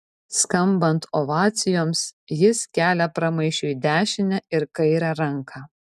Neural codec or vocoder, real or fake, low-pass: none; real; 14.4 kHz